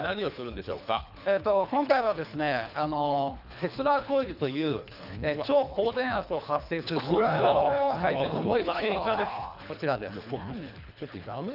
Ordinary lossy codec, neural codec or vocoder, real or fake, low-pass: none; codec, 24 kHz, 3 kbps, HILCodec; fake; 5.4 kHz